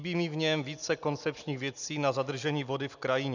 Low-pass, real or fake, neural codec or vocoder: 7.2 kHz; real; none